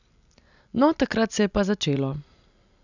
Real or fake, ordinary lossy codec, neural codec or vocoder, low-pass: real; none; none; 7.2 kHz